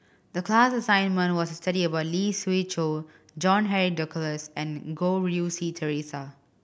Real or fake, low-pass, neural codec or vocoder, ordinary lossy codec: real; none; none; none